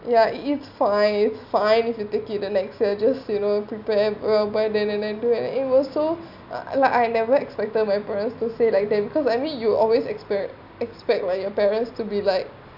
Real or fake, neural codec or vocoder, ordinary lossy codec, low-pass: real; none; none; 5.4 kHz